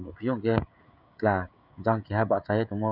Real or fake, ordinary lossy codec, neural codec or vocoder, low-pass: fake; none; codec, 16 kHz, 16 kbps, FreqCodec, smaller model; 5.4 kHz